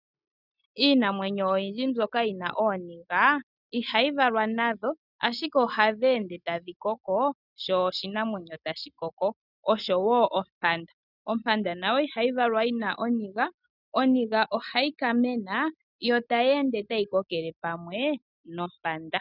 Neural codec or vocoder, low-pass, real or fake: none; 5.4 kHz; real